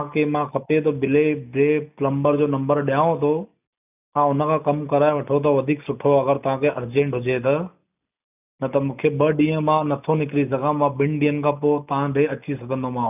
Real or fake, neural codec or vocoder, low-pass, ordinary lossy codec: real; none; 3.6 kHz; none